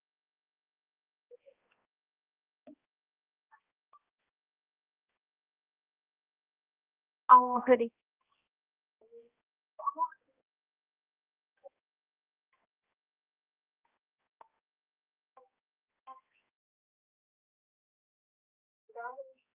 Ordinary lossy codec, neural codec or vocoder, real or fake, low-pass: Opus, 24 kbps; codec, 16 kHz, 1 kbps, X-Codec, HuBERT features, trained on balanced general audio; fake; 3.6 kHz